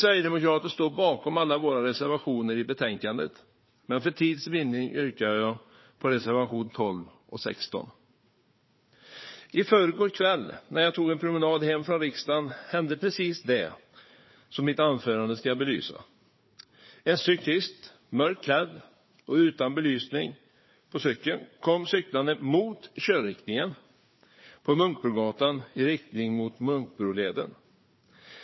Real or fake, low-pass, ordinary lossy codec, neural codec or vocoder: fake; 7.2 kHz; MP3, 24 kbps; codec, 16 kHz, 4 kbps, FunCodec, trained on Chinese and English, 50 frames a second